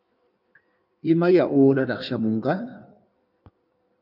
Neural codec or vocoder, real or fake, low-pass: codec, 16 kHz in and 24 kHz out, 1.1 kbps, FireRedTTS-2 codec; fake; 5.4 kHz